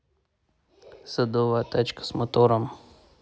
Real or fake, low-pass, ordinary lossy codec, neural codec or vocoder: real; none; none; none